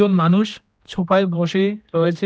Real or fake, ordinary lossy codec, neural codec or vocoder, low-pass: fake; none; codec, 16 kHz, 1 kbps, X-Codec, HuBERT features, trained on general audio; none